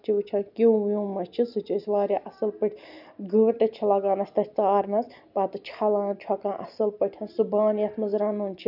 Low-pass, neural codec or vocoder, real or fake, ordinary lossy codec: 5.4 kHz; none; real; none